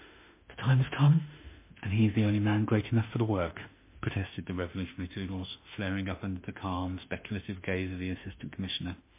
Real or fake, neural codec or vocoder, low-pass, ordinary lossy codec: fake; autoencoder, 48 kHz, 32 numbers a frame, DAC-VAE, trained on Japanese speech; 3.6 kHz; MP3, 24 kbps